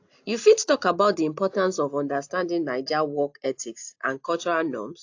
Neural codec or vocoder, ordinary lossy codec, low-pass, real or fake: none; AAC, 48 kbps; 7.2 kHz; real